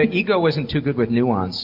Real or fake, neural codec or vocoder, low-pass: real; none; 5.4 kHz